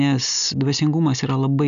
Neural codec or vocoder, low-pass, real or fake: none; 7.2 kHz; real